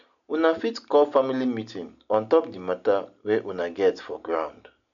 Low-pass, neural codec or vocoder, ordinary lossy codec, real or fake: 7.2 kHz; none; none; real